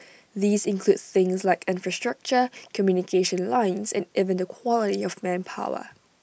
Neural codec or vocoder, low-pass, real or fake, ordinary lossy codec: none; none; real; none